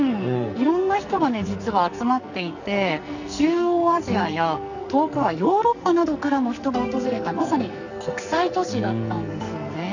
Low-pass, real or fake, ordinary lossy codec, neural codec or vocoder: 7.2 kHz; fake; none; codec, 44.1 kHz, 2.6 kbps, SNAC